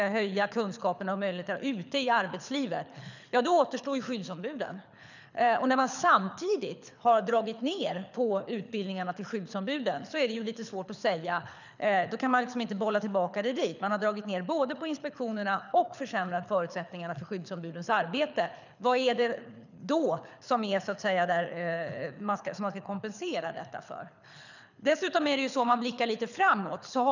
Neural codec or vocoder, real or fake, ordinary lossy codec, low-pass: codec, 24 kHz, 6 kbps, HILCodec; fake; none; 7.2 kHz